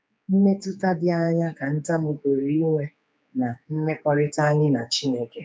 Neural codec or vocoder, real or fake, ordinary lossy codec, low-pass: codec, 16 kHz, 4 kbps, X-Codec, HuBERT features, trained on general audio; fake; none; none